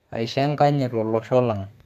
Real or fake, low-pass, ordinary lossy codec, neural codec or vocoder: fake; 14.4 kHz; MP3, 96 kbps; codec, 32 kHz, 1.9 kbps, SNAC